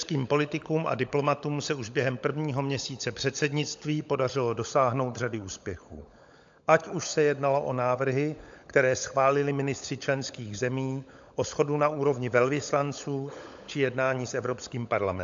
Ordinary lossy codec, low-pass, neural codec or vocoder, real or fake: AAC, 64 kbps; 7.2 kHz; codec, 16 kHz, 16 kbps, FunCodec, trained on LibriTTS, 50 frames a second; fake